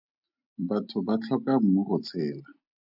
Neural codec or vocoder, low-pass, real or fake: none; 5.4 kHz; real